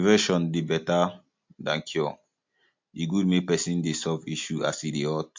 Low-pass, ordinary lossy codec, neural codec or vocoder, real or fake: 7.2 kHz; MP3, 48 kbps; none; real